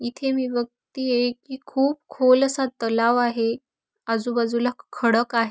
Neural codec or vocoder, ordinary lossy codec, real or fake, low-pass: none; none; real; none